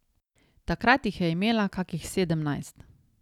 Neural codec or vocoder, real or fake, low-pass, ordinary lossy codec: none; real; 19.8 kHz; none